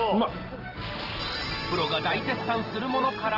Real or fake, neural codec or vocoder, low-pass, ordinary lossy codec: real; none; 5.4 kHz; Opus, 16 kbps